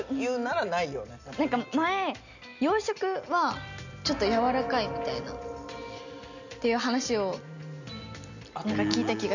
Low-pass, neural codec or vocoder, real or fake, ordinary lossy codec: 7.2 kHz; none; real; none